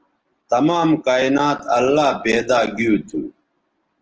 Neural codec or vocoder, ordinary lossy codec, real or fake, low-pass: none; Opus, 16 kbps; real; 7.2 kHz